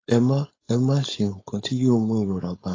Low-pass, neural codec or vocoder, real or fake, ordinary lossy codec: 7.2 kHz; codec, 16 kHz, 4.8 kbps, FACodec; fake; AAC, 32 kbps